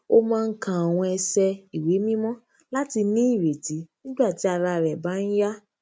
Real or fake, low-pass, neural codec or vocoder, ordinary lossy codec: real; none; none; none